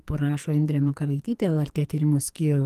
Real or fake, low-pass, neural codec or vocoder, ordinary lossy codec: fake; 14.4 kHz; codec, 44.1 kHz, 2.6 kbps, SNAC; Opus, 24 kbps